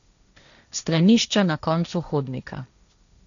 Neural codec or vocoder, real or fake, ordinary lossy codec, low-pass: codec, 16 kHz, 1.1 kbps, Voila-Tokenizer; fake; none; 7.2 kHz